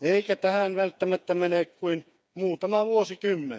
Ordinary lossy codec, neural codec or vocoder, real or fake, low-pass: none; codec, 16 kHz, 4 kbps, FreqCodec, smaller model; fake; none